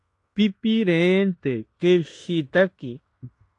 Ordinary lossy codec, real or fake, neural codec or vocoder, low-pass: AAC, 48 kbps; fake; codec, 16 kHz in and 24 kHz out, 0.9 kbps, LongCat-Audio-Codec, fine tuned four codebook decoder; 10.8 kHz